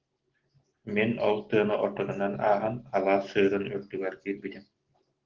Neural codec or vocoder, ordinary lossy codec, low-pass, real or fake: none; Opus, 16 kbps; 7.2 kHz; real